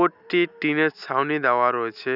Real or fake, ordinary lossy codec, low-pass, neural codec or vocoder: real; none; 5.4 kHz; none